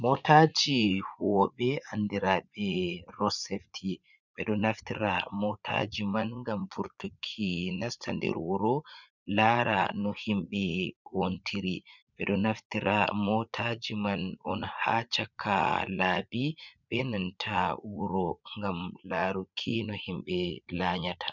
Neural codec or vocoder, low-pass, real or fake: vocoder, 44.1 kHz, 80 mel bands, Vocos; 7.2 kHz; fake